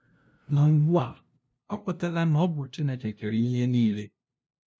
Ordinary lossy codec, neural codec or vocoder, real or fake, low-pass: none; codec, 16 kHz, 0.5 kbps, FunCodec, trained on LibriTTS, 25 frames a second; fake; none